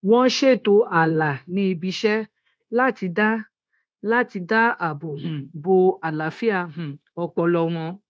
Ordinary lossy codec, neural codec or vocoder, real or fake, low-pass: none; codec, 16 kHz, 0.9 kbps, LongCat-Audio-Codec; fake; none